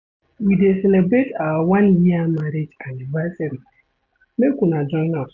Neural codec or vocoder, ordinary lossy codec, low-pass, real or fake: none; none; 7.2 kHz; real